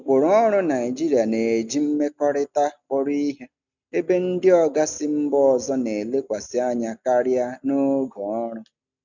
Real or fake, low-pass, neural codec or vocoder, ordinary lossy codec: real; 7.2 kHz; none; none